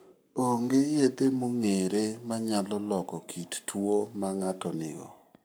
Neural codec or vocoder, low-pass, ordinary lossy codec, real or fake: codec, 44.1 kHz, 7.8 kbps, Pupu-Codec; none; none; fake